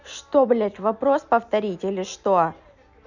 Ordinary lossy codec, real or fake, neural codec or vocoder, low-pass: none; real; none; 7.2 kHz